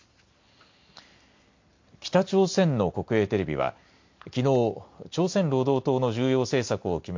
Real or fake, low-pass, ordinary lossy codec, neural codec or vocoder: real; 7.2 kHz; MP3, 48 kbps; none